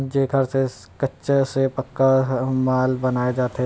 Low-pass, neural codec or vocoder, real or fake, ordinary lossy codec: none; none; real; none